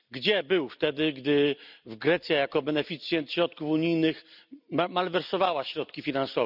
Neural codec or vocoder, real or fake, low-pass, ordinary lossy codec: none; real; 5.4 kHz; none